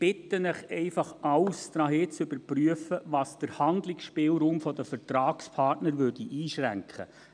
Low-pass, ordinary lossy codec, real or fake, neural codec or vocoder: 9.9 kHz; none; real; none